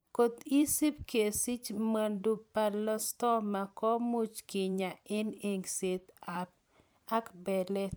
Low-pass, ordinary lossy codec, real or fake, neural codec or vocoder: none; none; fake; vocoder, 44.1 kHz, 128 mel bands every 512 samples, BigVGAN v2